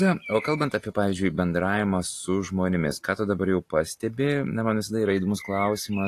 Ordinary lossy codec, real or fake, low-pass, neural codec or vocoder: AAC, 48 kbps; real; 14.4 kHz; none